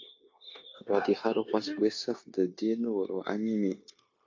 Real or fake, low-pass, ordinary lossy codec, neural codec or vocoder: fake; 7.2 kHz; AAC, 48 kbps; codec, 16 kHz, 0.9 kbps, LongCat-Audio-Codec